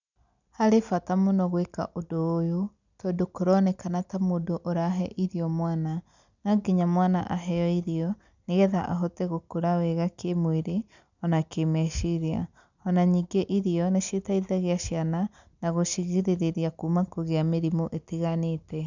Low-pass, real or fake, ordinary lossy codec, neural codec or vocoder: 7.2 kHz; real; none; none